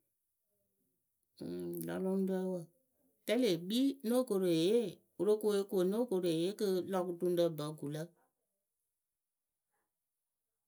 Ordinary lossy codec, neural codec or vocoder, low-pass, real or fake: none; none; none; real